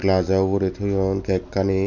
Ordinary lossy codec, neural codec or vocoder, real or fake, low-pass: none; none; real; 7.2 kHz